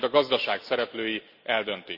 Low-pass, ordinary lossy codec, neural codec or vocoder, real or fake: 5.4 kHz; none; none; real